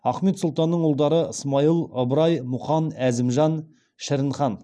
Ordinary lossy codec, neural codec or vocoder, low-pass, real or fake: none; none; none; real